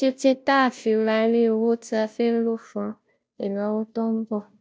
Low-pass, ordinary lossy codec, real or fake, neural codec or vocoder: none; none; fake; codec, 16 kHz, 0.5 kbps, FunCodec, trained on Chinese and English, 25 frames a second